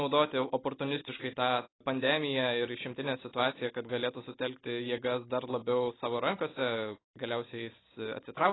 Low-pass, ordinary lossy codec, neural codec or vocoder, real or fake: 7.2 kHz; AAC, 16 kbps; none; real